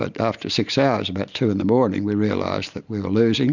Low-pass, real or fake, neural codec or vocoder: 7.2 kHz; real; none